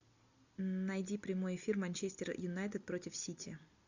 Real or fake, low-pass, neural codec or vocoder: real; 7.2 kHz; none